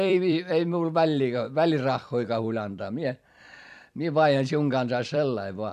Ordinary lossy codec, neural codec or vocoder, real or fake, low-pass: none; vocoder, 44.1 kHz, 128 mel bands every 256 samples, BigVGAN v2; fake; 14.4 kHz